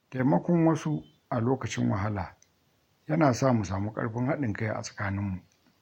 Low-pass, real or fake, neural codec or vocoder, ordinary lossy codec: 19.8 kHz; real; none; MP3, 64 kbps